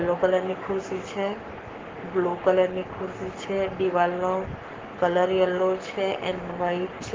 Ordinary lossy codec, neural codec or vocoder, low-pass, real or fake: Opus, 16 kbps; codec, 44.1 kHz, 7.8 kbps, Pupu-Codec; 7.2 kHz; fake